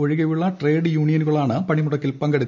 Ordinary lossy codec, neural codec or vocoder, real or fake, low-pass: none; none; real; 7.2 kHz